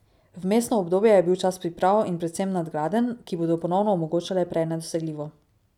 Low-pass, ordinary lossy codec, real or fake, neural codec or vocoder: 19.8 kHz; none; real; none